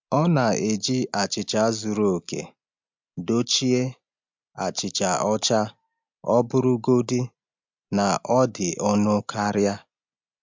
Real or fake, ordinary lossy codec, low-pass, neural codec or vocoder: real; MP3, 64 kbps; 7.2 kHz; none